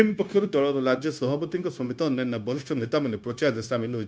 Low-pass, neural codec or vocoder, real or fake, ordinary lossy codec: none; codec, 16 kHz, 0.9 kbps, LongCat-Audio-Codec; fake; none